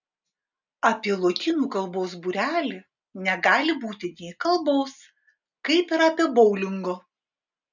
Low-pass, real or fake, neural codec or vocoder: 7.2 kHz; real; none